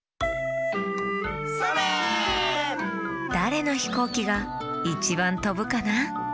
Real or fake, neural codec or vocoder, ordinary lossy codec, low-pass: real; none; none; none